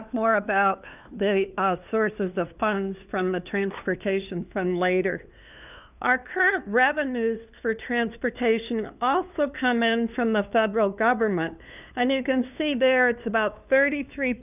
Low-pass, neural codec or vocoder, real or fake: 3.6 kHz; codec, 16 kHz, 2 kbps, FunCodec, trained on LibriTTS, 25 frames a second; fake